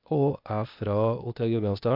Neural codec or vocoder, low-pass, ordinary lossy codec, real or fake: codec, 16 kHz, 0.8 kbps, ZipCodec; 5.4 kHz; none; fake